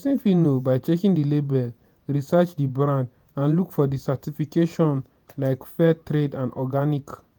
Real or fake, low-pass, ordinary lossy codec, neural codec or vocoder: fake; none; none; vocoder, 48 kHz, 128 mel bands, Vocos